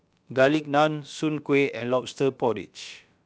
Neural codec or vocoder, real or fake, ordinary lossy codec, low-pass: codec, 16 kHz, about 1 kbps, DyCAST, with the encoder's durations; fake; none; none